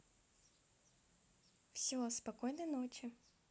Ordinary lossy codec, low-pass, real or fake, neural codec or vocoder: none; none; real; none